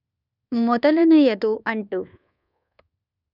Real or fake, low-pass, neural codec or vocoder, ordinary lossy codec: fake; 5.4 kHz; codec, 24 kHz, 1.2 kbps, DualCodec; none